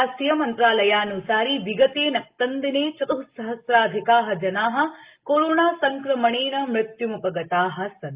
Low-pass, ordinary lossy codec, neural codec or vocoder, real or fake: 3.6 kHz; Opus, 24 kbps; none; real